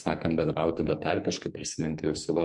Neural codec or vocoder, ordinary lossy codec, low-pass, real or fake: codec, 44.1 kHz, 2.6 kbps, SNAC; MP3, 64 kbps; 10.8 kHz; fake